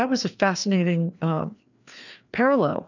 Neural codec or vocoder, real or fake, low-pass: codec, 16 kHz, 2 kbps, FreqCodec, larger model; fake; 7.2 kHz